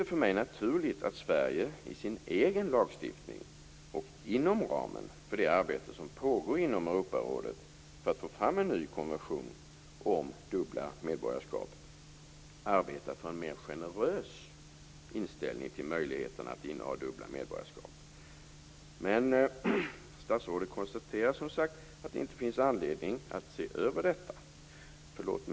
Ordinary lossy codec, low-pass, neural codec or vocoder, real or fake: none; none; none; real